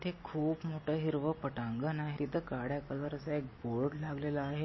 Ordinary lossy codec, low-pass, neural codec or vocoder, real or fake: MP3, 24 kbps; 7.2 kHz; none; real